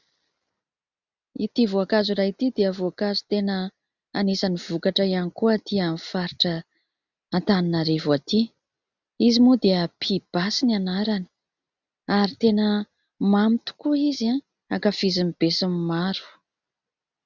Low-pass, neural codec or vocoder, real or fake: 7.2 kHz; none; real